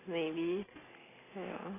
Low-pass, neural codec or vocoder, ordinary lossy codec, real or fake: 3.6 kHz; none; AAC, 16 kbps; real